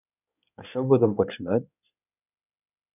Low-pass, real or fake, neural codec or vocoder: 3.6 kHz; fake; codec, 16 kHz in and 24 kHz out, 2.2 kbps, FireRedTTS-2 codec